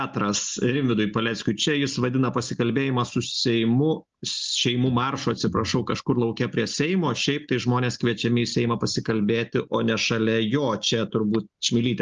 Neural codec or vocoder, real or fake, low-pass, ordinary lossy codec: none; real; 7.2 kHz; Opus, 32 kbps